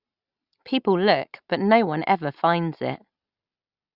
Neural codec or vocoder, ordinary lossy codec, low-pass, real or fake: none; Opus, 64 kbps; 5.4 kHz; real